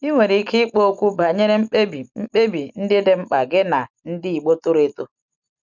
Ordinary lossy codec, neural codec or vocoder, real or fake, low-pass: none; none; real; 7.2 kHz